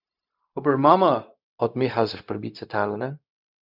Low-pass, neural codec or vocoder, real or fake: 5.4 kHz; codec, 16 kHz, 0.4 kbps, LongCat-Audio-Codec; fake